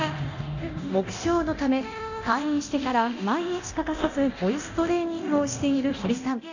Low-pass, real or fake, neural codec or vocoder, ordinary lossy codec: 7.2 kHz; fake; codec, 24 kHz, 0.9 kbps, DualCodec; none